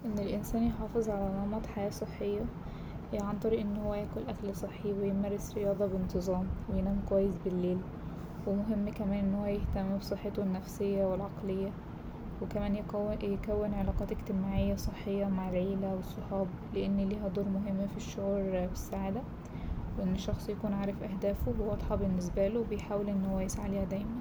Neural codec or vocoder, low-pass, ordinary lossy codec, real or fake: none; none; none; real